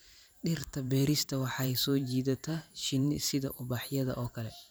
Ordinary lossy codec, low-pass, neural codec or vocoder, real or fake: none; none; vocoder, 44.1 kHz, 128 mel bands every 512 samples, BigVGAN v2; fake